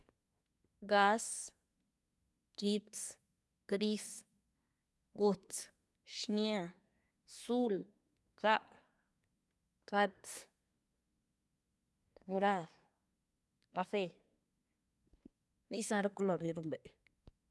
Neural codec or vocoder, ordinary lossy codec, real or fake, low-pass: codec, 24 kHz, 1 kbps, SNAC; none; fake; none